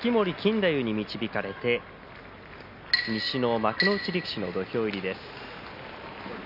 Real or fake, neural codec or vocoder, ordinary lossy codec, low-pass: real; none; none; 5.4 kHz